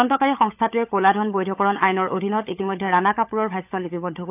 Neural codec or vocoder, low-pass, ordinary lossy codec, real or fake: codec, 16 kHz, 16 kbps, FunCodec, trained on LibriTTS, 50 frames a second; 3.6 kHz; AAC, 32 kbps; fake